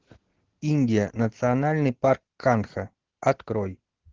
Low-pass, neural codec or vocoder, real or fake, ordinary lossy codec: 7.2 kHz; none; real; Opus, 16 kbps